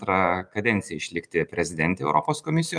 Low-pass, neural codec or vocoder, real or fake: 9.9 kHz; none; real